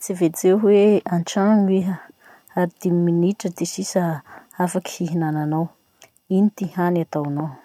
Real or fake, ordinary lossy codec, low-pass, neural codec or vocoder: real; none; 19.8 kHz; none